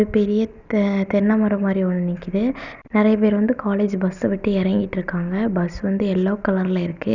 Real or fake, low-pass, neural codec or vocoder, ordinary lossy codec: real; 7.2 kHz; none; none